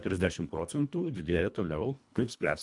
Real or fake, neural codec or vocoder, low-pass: fake; codec, 24 kHz, 1.5 kbps, HILCodec; 10.8 kHz